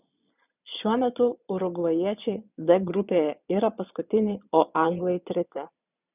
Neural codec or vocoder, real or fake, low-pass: vocoder, 22.05 kHz, 80 mel bands, Vocos; fake; 3.6 kHz